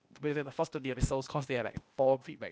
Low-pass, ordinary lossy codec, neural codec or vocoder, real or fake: none; none; codec, 16 kHz, 0.8 kbps, ZipCodec; fake